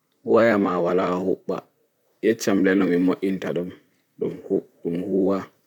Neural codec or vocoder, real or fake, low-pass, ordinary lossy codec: vocoder, 44.1 kHz, 128 mel bands, Pupu-Vocoder; fake; 19.8 kHz; none